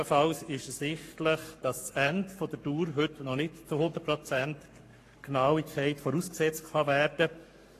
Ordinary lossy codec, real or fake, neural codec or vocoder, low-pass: AAC, 48 kbps; fake; codec, 44.1 kHz, 7.8 kbps, Pupu-Codec; 14.4 kHz